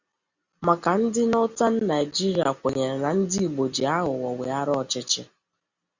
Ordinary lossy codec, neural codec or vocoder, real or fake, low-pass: Opus, 64 kbps; none; real; 7.2 kHz